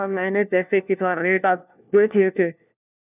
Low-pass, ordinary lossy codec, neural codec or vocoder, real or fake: 3.6 kHz; none; codec, 16 kHz, 1 kbps, FunCodec, trained on LibriTTS, 50 frames a second; fake